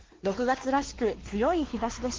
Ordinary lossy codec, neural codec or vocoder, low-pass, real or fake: Opus, 16 kbps; codec, 16 kHz, 2 kbps, X-Codec, WavLM features, trained on Multilingual LibriSpeech; 7.2 kHz; fake